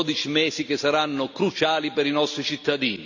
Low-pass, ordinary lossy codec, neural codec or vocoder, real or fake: 7.2 kHz; none; none; real